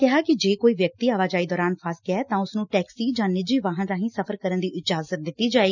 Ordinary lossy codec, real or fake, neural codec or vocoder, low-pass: none; real; none; 7.2 kHz